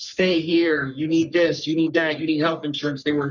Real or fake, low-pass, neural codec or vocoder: fake; 7.2 kHz; codec, 44.1 kHz, 3.4 kbps, Pupu-Codec